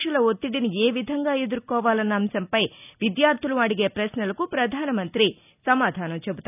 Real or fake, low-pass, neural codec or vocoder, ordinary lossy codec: real; 3.6 kHz; none; none